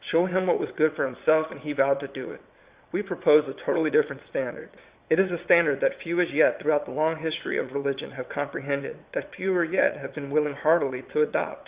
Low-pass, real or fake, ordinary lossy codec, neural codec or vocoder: 3.6 kHz; fake; Opus, 64 kbps; vocoder, 22.05 kHz, 80 mel bands, WaveNeXt